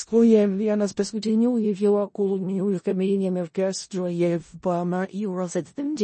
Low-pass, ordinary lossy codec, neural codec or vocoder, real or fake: 10.8 kHz; MP3, 32 kbps; codec, 16 kHz in and 24 kHz out, 0.4 kbps, LongCat-Audio-Codec, four codebook decoder; fake